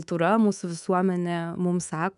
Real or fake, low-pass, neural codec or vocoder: fake; 10.8 kHz; codec, 24 kHz, 3.1 kbps, DualCodec